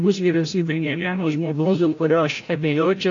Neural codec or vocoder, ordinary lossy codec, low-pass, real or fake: codec, 16 kHz, 0.5 kbps, FreqCodec, larger model; AAC, 48 kbps; 7.2 kHz; fake